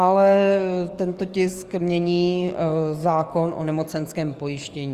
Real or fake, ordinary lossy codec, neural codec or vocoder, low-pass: fake; Opus, 32 kbps; codec, 44.1 kHz, 7.8 kbps, DAC; 14.4 kHz